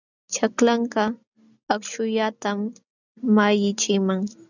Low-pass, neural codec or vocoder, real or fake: 7.2 kHz; none; real